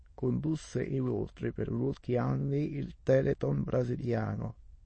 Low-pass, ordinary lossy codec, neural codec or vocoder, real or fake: 9.9 kHz; MP3, 32 kbps; autoencoder, 22.05 kHz, a latent of 192 numbers a frame, VITS, trained on many speakers; fake